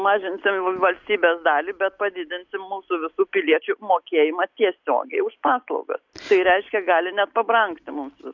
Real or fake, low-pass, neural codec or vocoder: real; 7.2 kHz; none